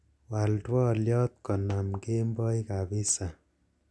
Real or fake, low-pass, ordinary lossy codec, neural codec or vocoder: real; none; none; none